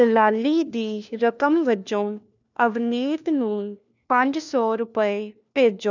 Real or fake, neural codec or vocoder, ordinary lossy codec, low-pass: fake; codec, 16 kHz, 1 kbps, FunCodec, trained on LibriTTS, 50 frames a second; none; 7.2 kHz